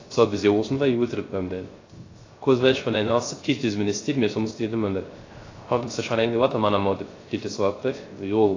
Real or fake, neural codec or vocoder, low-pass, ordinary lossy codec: fake; codec, 16 kHz, 0.3 kbps, FocalCodec; 7.2 kHz; AAC, 32 kbps